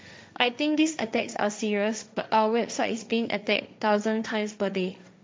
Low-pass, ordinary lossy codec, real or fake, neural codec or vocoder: none; none; fake; codec, 16 kHz, 1.1 kbps, Voila-Tokenizer